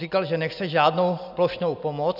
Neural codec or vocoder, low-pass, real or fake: none; 5.4 kHz; real